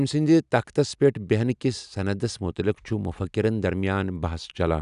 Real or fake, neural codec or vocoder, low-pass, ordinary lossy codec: real; none; 10.8 kHz; none